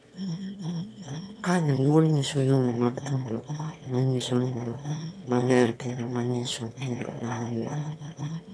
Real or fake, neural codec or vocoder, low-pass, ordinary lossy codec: fake; autoencoder, 22.05 kHz, a latent of 192 numbers a frame, VITS, trained on one speaker; none; none